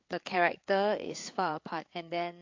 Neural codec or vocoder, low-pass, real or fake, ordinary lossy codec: codec, 16 kHz, 4 kbps, FreqCodec, larger model; 7.2 kHz; fake; MP3, 48 kbps